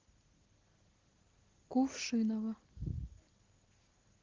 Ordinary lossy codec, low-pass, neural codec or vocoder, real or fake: Opus, 16 kbps; 7.2 kHz; none; real